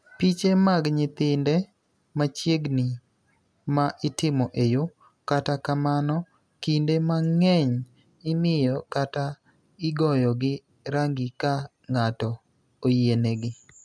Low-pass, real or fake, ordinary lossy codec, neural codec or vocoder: none; real; none; none